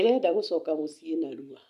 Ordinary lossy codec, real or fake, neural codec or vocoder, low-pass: none; real; none; 14.4 kHz